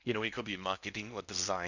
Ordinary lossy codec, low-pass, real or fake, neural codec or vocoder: none; 7.2 kHz; fake; codec, 16 kHz in and 24 kHz out, 0.8 kbps, FocalCodec, streaming, 65536 codes